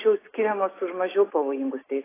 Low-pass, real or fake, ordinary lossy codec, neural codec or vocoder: 3.6 kHz; real; AAC, 16 kbps; none